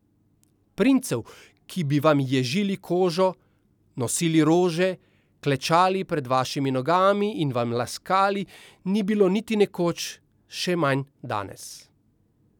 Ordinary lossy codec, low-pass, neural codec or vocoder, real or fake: none; 19.8 kHz; none; real